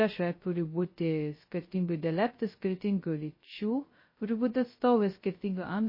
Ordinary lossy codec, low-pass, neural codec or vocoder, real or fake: MP3, 24 kbps; 5.4 kHz; codec, 16 kHz, 0.2 kbps, FocalCodec; fake